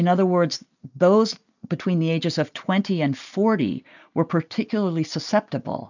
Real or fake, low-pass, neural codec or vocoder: real; 7.2 kHz; none